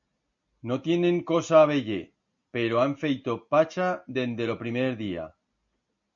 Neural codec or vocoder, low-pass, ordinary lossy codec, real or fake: none; 7.2 kHz; MP3, 64 kbps; real